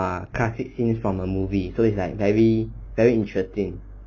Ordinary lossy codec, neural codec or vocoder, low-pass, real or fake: none; none; 7.2 kHz; real